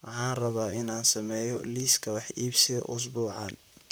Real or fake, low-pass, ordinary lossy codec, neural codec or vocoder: fake; none; none; vocoder, 44.1 kHz, 128 mel bands, Pupu-Vocoder